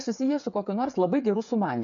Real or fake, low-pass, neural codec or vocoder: fake; 7.2 kHz; codec, 16 kHz, 8 kbps, FreqCodec, smaller model